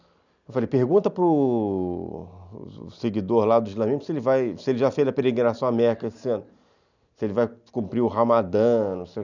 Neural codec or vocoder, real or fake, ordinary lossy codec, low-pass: none; real; none; 7.2 kHz